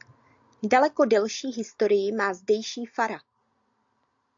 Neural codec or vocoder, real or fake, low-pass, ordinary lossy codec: none; real; 7.2 kHz; AAC, 64 kbps